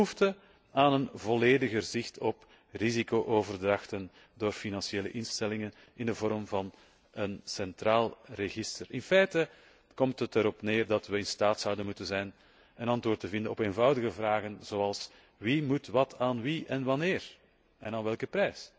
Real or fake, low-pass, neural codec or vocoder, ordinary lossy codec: real; none; none; none